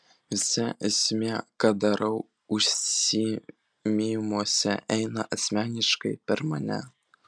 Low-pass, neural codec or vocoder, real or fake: 9.9 kHz; none; real